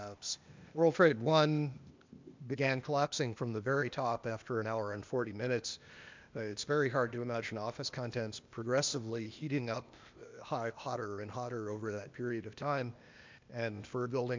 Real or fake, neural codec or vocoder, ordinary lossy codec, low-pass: fake; codec, 16 kHz, 0.8 kbps, ZipCodec; MP3, 64 kbps; 7.2 kHz